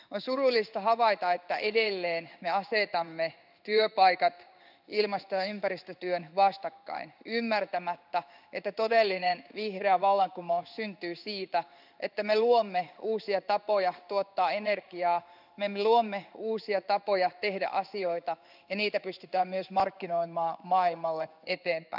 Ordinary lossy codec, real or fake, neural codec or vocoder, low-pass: none; fake; codec, 16 kHz in and 24 kHz out, 1 kbps, XY-Tokenizer; 5.4 kHz